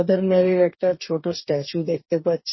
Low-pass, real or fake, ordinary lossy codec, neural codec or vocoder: 7.2 kHz; fake; MP3, 24 kbps; codec, 44.1 kHz, 2.6 kbps, DAC